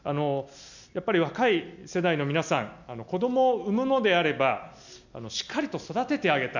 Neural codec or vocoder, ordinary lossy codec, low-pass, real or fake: none; none; 7.2 kHz; real